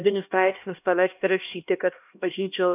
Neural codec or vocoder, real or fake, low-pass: codec, 16 kHz, 1 kbps, X-Codec, HuBERT features, trained on LibriSpeech; fake; 3.6 kHz